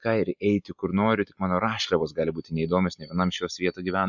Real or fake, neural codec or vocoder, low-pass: real; none; 7.2 kHz